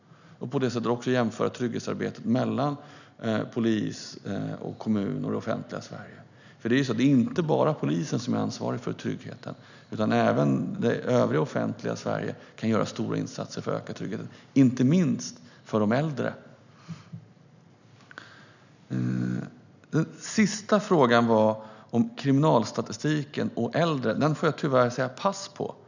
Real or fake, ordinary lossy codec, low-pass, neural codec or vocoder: real; none; 7.2 kHz; none